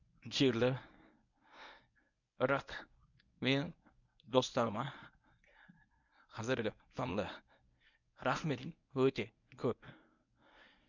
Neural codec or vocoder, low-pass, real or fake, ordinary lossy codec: codec, 24 kHz, 0.9 kbps, WavTokenizer, medium speech release version 1; 7.2 kHz; fake; none